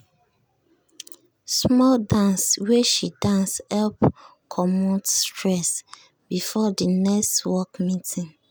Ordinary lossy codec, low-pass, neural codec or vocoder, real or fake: none; none; none; real